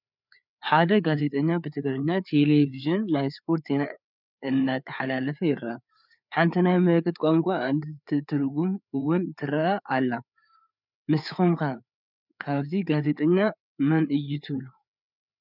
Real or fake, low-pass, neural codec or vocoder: fake; 5.4 kHz; codec, 16 kHz, 4 kbps, FreqCodec, larger model